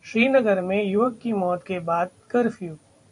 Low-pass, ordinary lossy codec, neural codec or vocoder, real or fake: 10.8 kHz; AAC, 64 kbps; vocoder, 44.1 kHz, 128 mel bands every 512 samples, BigVGAN v2; fake